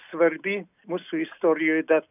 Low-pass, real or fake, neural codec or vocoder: 3.6 kHz; real; none